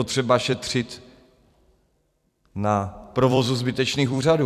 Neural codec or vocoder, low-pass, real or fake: vocoder, 44.1 kHz, 128 mel bands every 256 samples, BigVGAN v2; 14.4 kHz; fake